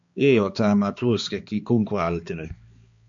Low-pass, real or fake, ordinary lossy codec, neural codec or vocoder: 7.2 kHz; fake; MP3, 48 kbps; codec, 16 kHz, 2 kbps, X-Codec, HuBERT features, trained on balanced general audio